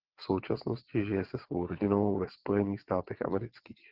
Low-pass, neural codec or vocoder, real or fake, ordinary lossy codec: 5.4 kHz; vocoder, 22.05 kHz, 80 mel bands, WaveNeXt; fake; Opus, 16 kbps